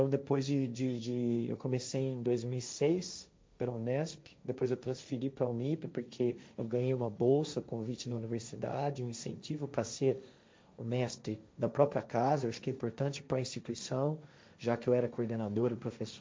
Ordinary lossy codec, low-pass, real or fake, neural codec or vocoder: none; none; fake; codec, 16 kHz, 1.1 kbps, Voila-Tokenizer